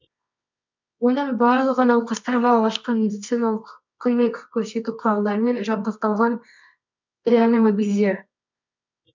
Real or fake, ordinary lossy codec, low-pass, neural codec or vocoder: fake; MP3, 64 kbps; 7.2 kHz; codec, 24 kHz, 0.9 kbps, WavTokenizer, medium music audio release